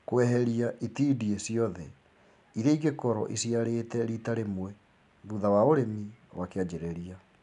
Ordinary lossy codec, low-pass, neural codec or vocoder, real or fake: none; 10.8 kHz; none; real